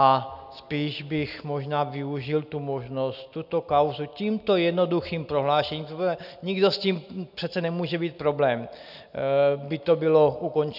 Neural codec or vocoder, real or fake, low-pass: none; real; 5.4 kHz